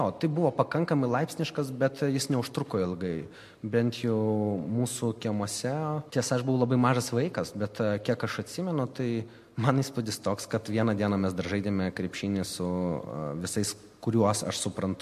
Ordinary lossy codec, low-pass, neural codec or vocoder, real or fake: MP3, 64 kbps; 14.4 kHz; none; real